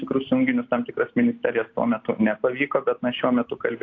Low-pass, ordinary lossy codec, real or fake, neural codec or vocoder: 7.2 kHz; AAC, 48 kbps; real; none